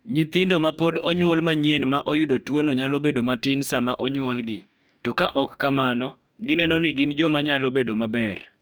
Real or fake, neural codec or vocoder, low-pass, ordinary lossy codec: fake; codec, 44.1 kHz, 2.6 kbps, DAC; none; none